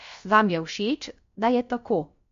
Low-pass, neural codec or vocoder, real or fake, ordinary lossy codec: 7.2 kHz; codec, 16 kHz, about 1 kbps, DyCAST, with the encoder's durations; fake; MP3, 48 kbps